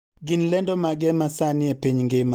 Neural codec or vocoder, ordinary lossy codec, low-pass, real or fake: none; Opus, 24 kbps; 19.8 kHz; real